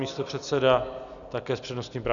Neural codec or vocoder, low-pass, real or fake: none; 7.2 kHz; real